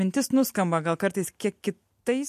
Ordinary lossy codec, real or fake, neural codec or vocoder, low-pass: MP3, 64 kbps; real; none; 14.4 kHz